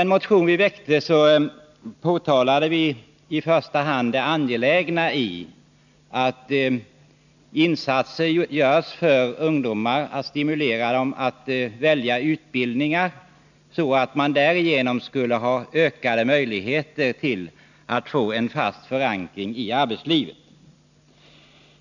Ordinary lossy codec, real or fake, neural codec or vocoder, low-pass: none; real; none; 7.2 kHz